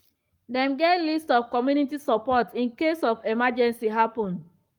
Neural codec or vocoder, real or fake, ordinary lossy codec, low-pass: codec, 44.1 kHz, 7.8 kbps, Pupu-Codec; fake; Opus, 32 kbps; 19.8 kHz